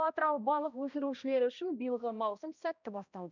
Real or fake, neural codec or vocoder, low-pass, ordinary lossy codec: fake; codec, 16 kHz, 1 kbps, X-Codec, HuBERT features, trained on balanced general audio; 7.2 kHz; none